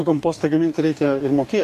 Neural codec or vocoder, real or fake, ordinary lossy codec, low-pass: codec, 44.1 kHz, 2.6 kbps, DAC; fake; MP3, 96 kbps; 14.4 kHz